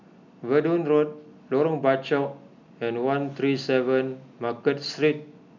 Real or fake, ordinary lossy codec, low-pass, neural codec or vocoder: real; none; 7.2 kHz; none